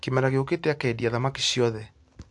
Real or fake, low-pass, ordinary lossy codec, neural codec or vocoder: real; 10.8 kHz; AAC, 64 kbps; none